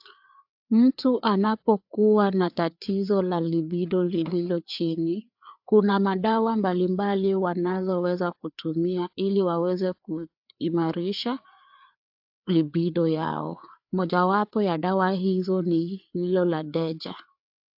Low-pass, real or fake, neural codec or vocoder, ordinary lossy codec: 5.4 kHz; fake; codec, 16 kHz, 4 kbps, FreqCodec, larger model; AAC, 48 kbps